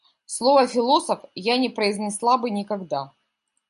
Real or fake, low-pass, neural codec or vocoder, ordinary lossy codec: real; 10.8 kHz; none; AAC, 96 kbps